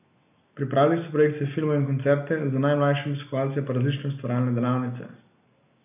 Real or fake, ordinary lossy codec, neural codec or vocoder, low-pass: real; none; none; 3.6 kHz